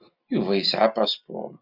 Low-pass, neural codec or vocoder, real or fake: 5.4 kHz; none; real